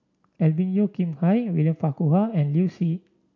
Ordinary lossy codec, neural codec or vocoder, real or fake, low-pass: none; none; real; 7.2 kHz